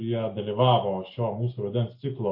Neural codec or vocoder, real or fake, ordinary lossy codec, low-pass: none; real; MP3, 32 kbps; 5.4 kHz